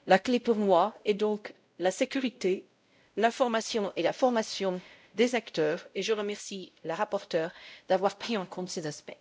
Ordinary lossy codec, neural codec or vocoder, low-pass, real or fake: none; codec, 16 kHz, 0.5 kbps, X-Codec, WavLM features, trained on Multilingual LibriSpeech; none; fake